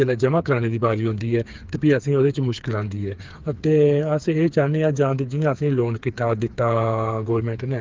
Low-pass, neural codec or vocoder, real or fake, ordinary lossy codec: 7.2 kHz; codec, 16 kHz, 4 kbps, FreqCodec, smaller model; fake; Opus, 32 kbps